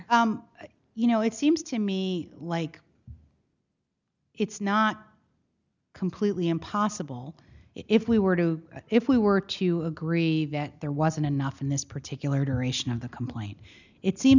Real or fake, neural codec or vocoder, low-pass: real; none; 7.2 kHz